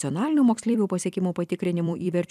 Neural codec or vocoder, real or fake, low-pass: vocoder, 44.1 kHz, 128 mel bands every 256 samples, BigVGAN v2; fake; 14.4 kHz